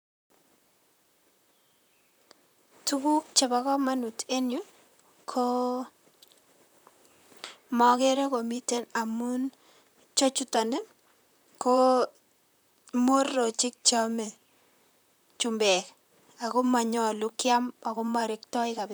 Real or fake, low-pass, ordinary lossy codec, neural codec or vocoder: fake; none; none; vocoder, 44.1 kHz, 128 mel bands, Pupu-Vocoder